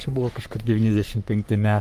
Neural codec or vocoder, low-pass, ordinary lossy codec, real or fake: codec, 44.1 kHz, 3.4 kbps, Pupu-Codec; 14.4 kHz; Opus, 32 kbps; fake